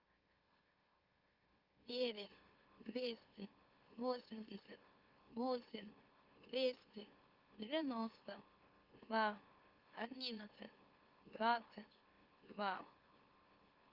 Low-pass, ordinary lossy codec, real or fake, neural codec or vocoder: 5.4 kHz; Opus, 32 kbps; fake; autoencoder, 44.1 kHz, a latent of 192 numbers a frame, MeloTTS